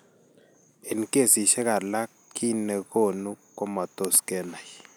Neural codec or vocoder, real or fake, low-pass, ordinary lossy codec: none; real; none; none